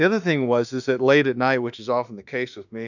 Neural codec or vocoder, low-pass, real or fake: autoencoder, 48 kHz, 32 numbers a frame, DAC-VAE, trained on Japanese speech; 7.2 kHz; fake